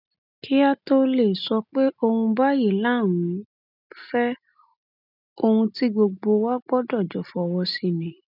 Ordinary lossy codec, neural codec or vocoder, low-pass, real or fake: none; none; 5.4 kHz; real